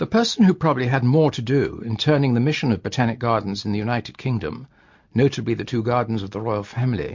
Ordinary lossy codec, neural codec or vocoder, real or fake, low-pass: MP3, 48 kbps; none; real; 7.2 kHz